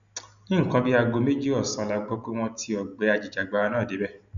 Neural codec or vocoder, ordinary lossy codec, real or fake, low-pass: none; none; real; 7.2 kHz